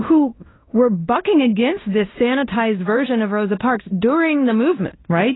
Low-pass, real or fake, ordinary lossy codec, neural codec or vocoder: 7.2 kHz; fake; AAC, 16 kbps; codec, 16 kHz in and 24 kHz out, 0.9 kbps, LongCat-Audio-Codec, fine tuned four codebook decoder